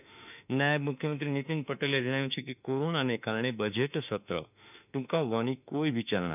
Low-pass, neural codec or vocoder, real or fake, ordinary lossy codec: 3.6 kHz; autoencoder, 48 kHz, 32 numbers a frame, DAC-VAE, trained on Japanese speech; fake; none